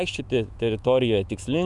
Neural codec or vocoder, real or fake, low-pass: autoencoder, 48 kHz, 128 numbers a frame, DAC-VAE, trained on Japanese speech; fake; 10.8 kHz